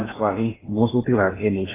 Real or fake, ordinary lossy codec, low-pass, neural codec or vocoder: fake; AAC, 16 kbps; 3.6 kHz; codec, 16 kHz in and 24 kHz out, 0.6 kbps, FocalCodec, streaming, 2048 codes